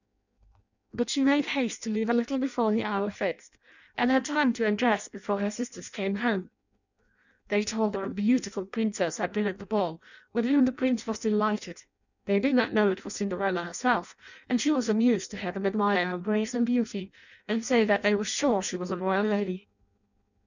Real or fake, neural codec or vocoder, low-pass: fake; codec, 16 kHz in and 24 kHz out, 0.6 kbps, FireRedTTS-2 codec; 7.2 kHz